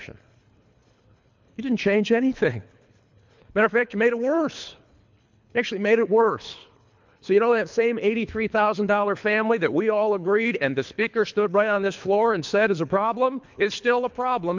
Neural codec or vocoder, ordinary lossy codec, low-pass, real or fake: codec, 24 kHz, 3 kbps, HILCodec; MP3, 64 kbps; 7.2 kHz; fake